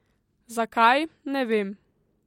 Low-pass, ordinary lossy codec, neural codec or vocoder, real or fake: 19.8 kHz; MP3, 64 kbps; none; real